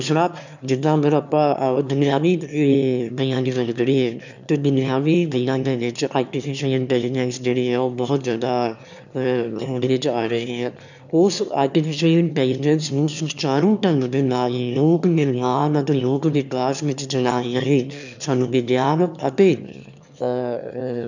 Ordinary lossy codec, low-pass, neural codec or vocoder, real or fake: none; 7.2 kHz; autoencoder, 22.05 kHz, a latent of 192 numbers a frame, VITS, trained on one speaker; fake